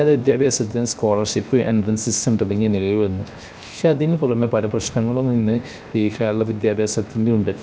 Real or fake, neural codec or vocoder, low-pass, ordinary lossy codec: fake; codec, 16 kHz, 0.7 kbps, FocalCodec; none; none